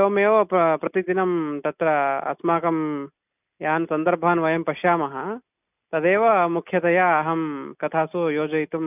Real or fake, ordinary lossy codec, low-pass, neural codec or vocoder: real; none; 3.6 kHz; none